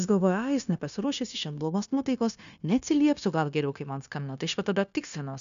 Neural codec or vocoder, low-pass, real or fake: codec, 16 kHz, 0.9 kbps, LongCat-Audio-Codec; 7.2 kHz; fake